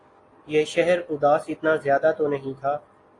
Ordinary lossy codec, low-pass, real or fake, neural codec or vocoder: AAC, 32 kbps; 10.8 kHz; real; none